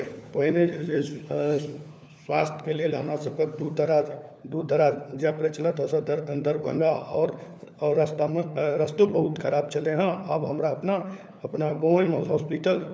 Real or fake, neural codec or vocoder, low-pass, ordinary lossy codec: fake; codec, 16 kHz, 4 kbps, FunCodec, trained on LibriTTS, 50 frames a second; none; none